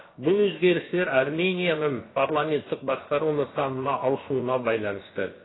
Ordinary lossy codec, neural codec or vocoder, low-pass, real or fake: AAC, 16 kbps; codec, 16 kHz, about 1 kbps, DyCAST, with the encoder's durations; 7.2 kHz; fake